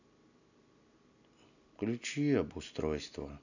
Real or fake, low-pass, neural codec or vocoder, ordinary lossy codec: real; 7.2 kHz; none; none